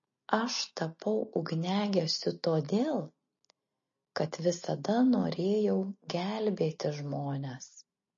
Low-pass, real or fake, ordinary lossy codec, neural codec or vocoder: 7.2 kHz; real; MP3, 32 kbps; none